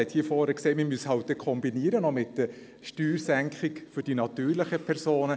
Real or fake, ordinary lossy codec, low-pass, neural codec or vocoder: real; none; none; none